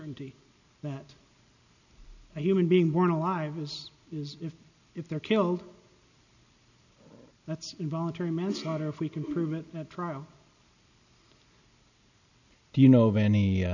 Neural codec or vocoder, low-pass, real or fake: none; 7.2 kHz; real